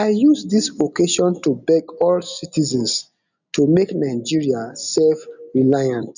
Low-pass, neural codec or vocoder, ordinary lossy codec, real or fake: 7.2 kHz; none; none; real